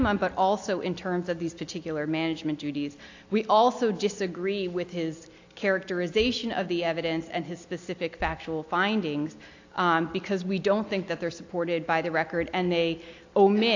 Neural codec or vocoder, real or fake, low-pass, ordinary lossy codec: none; real; 7.2 kHz; AAC, 48 kbps